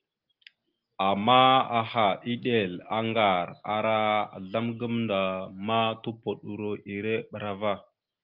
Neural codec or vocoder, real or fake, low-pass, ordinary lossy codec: none; real; 5.4 kHz; Opus, 24 kbps